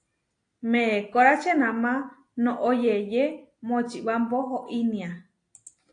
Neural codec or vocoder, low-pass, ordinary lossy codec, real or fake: none; 9.9 kHz; AAC, 48 kbps; real